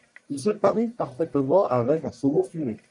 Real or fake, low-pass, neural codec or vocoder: fake; 10.8 kHz; codec, 44.1 kHz, 1.7 kbps, Pupu-Codec